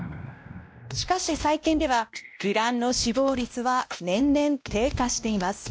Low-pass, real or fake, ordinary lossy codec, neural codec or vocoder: none; fake; none; codec, 16 kHz, 1 kbps, X-Codec, WavLM features, trained on Multilingual LibriSpeech